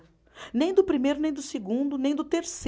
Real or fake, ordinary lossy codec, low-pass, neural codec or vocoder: real; none; none; none